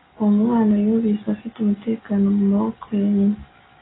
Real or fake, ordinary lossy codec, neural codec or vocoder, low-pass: real; AAC, 16 kbps; none; 7.2 kHz